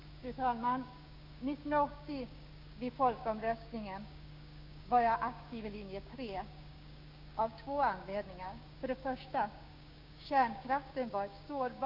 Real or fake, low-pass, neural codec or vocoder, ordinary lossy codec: fake; 5.4 kHz; codec, 16 kHz, 6 kbps, DAC; Opus, 64 kbps